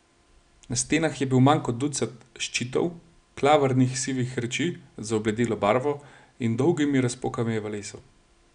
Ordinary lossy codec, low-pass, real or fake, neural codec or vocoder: none; 9.9 kHz; real; none